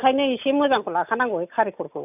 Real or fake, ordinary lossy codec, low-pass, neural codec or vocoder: real; none; 3.6 kHz; none